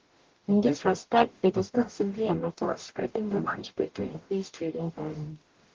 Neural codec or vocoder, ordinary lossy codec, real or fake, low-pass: codec, 44.1 kHz, 0.9 kbps, DAC; Opus, 16 kbps; fake; 7.2 kHz